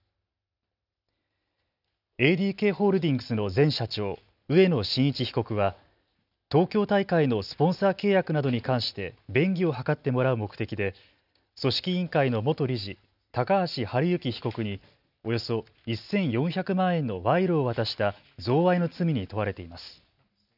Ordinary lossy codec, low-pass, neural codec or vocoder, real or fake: none; 5.4 kHz; none; real